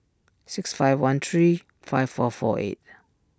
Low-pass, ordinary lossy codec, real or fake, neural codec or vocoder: none; none; real; none